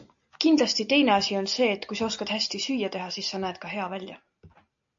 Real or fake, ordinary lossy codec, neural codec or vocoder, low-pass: real; AAC, 64 kbps; none; 7.2 kHz